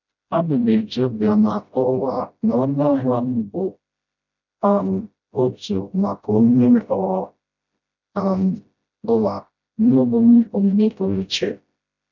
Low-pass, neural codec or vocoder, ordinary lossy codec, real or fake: 7.2 kHz; codec, 16 kHz, 0.5 kbps, FreqCodec, smaller model; none; fake